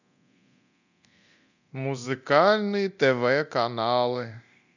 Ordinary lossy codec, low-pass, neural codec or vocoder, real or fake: none; 7.2 kHz; codec, 24 kHz, 0.9 kbps, DualCodec; fake